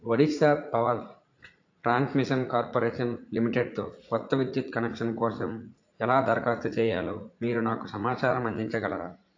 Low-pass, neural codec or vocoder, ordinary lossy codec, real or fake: 7.2 kHz; vocoder, 44.1 kHz, 80 mel bands, Vocos; AAC, 48 kbps; fake